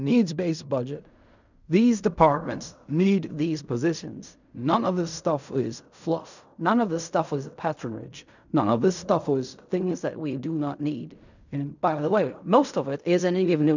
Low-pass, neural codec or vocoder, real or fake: 7.2 kHz; codec, 16 kHz in and 24 kHz out, 0.4 kbps, LongCat-Audio-Codec, fine tuned four codebook decoder; fake